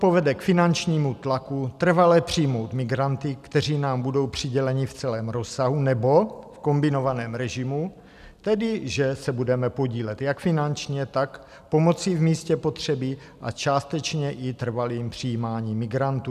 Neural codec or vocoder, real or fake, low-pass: none; real; 14.4 kHz